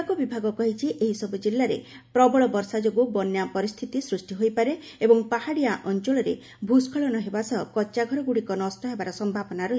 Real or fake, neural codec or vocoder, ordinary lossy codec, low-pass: real; none; none; none